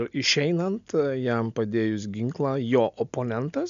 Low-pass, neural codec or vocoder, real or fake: 7.2 kHz; none; real